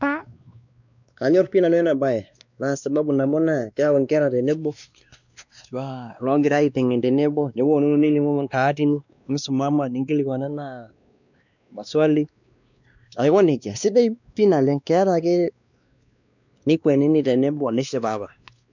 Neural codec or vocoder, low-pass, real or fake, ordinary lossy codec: codec, 16 kHz, 2 kbps, X-Codec, WavLM features, trained on Multilingual LibriSpeech; 7.2 kHz; fake; none